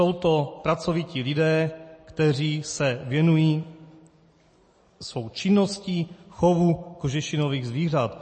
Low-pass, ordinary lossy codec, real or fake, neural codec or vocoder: 9.9 kHz; MP3, 32 kbps; real; none